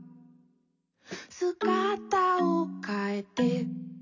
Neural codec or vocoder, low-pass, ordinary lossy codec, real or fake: none; 7.2 kHz; none; real